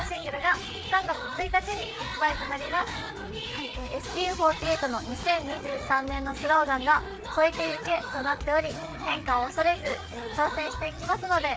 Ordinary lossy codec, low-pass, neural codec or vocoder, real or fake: none; none; codec, 16 kHz, 4 kbps, FreqCodec, larger model; fake